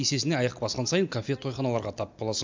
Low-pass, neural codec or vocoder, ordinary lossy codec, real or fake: 7.2 kHz; none; MP3, 64 kbps; real